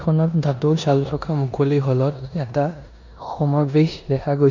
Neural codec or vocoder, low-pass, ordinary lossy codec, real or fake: codec, 16 kHz in and 24 kHz out, 0.9 kbps, LongCat-Audio-Codec, four codebook decoder; 7.2 kHz; MP3, 64 kbps; fake